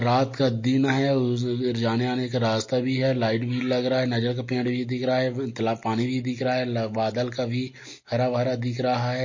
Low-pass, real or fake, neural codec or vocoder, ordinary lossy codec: 7.2 kHz; real; none; MP3, 32 kbps